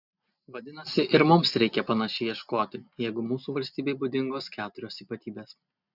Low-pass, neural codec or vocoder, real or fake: 5.4 kHz; none; real